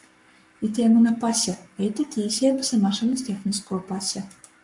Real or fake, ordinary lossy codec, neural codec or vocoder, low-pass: fake; MP3, 64 kbps; codec, 44.1 kHz, 7.8 kbps, Pupu-Codec; 10.8 kHz